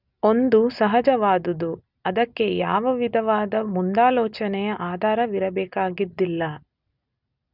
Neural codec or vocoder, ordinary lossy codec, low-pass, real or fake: none; none; 5.4 kHz; real